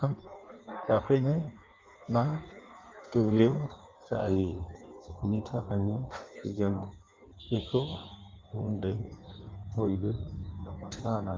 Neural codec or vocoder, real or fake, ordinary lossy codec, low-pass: codec, 16 kHz in and 24 kHz out, 1.1 kbps, FireRedTTS-2 codec; fake; Opus, 32 kbps; 7.2 kHz